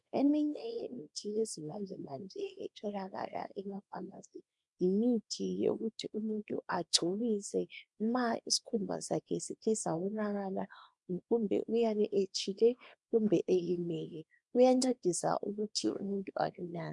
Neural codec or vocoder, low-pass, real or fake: codec, 24 kHz, 0.9 kbps, WavTokenizer, small release; 10.8 kHz; fake